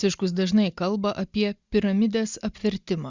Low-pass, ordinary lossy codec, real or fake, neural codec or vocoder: 7.2 kHz; Opus, 64 kbps; real; none